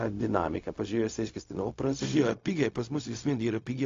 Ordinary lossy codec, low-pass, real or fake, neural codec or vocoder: AAC, 48 kbps; 7.2 kHz; fake; codec, 16 kHz, 0.4 kbps, LongCat-Audio-Codec